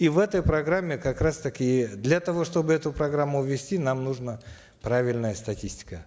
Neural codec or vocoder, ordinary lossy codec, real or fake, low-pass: none; none; real; none